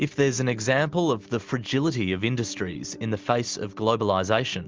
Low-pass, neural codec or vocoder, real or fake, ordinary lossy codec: 7.2 kHz; none; real; Opus, 32 kbps